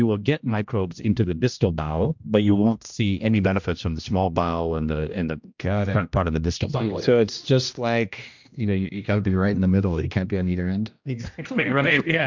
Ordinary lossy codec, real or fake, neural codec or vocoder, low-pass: MP3, 64 kbps; fake; codec, 16 kHz, 1 kbps, X-Codec, HuBERT features, trained on general audio; 7.2 kHz